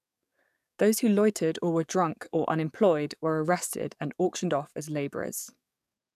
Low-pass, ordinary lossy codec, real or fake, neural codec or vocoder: 14.4 kHz; none; fake; codec, 44.1 kHz, 7.8 kbps, DAC